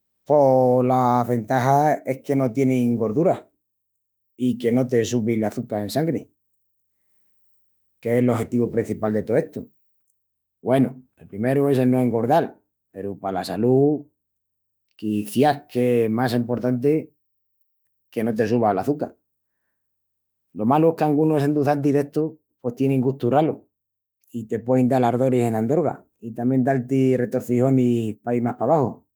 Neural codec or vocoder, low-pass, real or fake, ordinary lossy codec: autoencoder, 48 kHz, 32 numbers a frame, DAC-VAE, trained on Japanese speech; none; fake; none